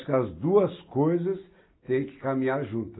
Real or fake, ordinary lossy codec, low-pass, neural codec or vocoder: real; AAC, 16 kbps; 7.2 kHz; none